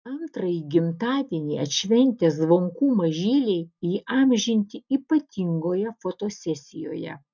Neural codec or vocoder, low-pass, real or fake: none; 7.2 kHz; real